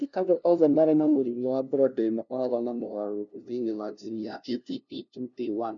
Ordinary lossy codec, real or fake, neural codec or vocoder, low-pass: none; fake; codec, 16 kHz, 0.5 kbps, FunCodec, trained on LibriTTS, 25 frames a second; 7.2 kHz